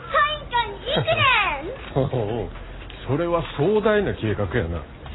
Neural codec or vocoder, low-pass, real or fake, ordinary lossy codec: none; 7.2 kHz; real; AAC, 16 kbps